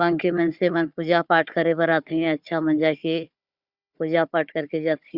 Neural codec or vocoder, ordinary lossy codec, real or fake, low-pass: codec, 16 kHz, 4 kbps, FunCodec, trained on Chinese and English, 50 frames a second; Opus, 64 kbps; fake; 5.4 kHz